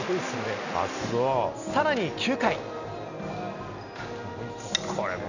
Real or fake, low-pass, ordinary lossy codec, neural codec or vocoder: real; 7.2 kHz; none; none